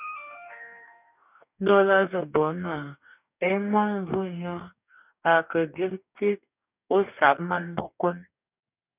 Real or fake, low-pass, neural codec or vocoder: fake; 3.6 kHz; codec, 44.1 kHz, 2.6 kbps, DAC